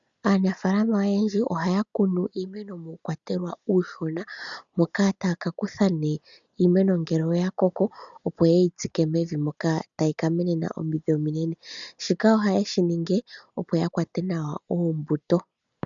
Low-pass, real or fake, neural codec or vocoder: 7.2 kHz; real; none